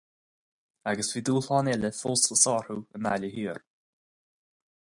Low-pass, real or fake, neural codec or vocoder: 10.8 kHz; real; none